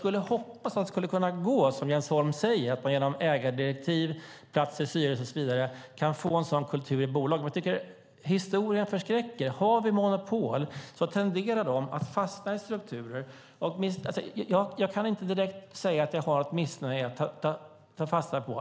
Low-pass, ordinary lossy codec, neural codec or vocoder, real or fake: none; none; none; real